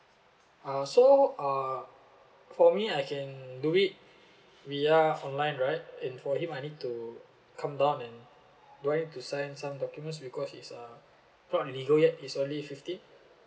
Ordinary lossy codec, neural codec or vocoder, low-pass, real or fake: none; none; none; real